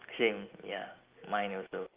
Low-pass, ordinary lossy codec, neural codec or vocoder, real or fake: 3.6 kHz; Opus, 24 kbps; none; real